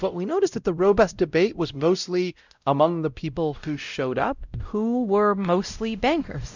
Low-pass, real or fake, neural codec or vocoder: 7.2 kHz; fake; codec, 16 kHz, 0.5 kbps, X-Codec, WavLM features, trained on Multilingual LibriSpeech